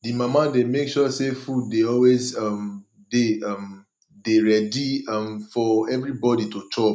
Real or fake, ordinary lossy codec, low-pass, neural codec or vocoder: real; none; none; none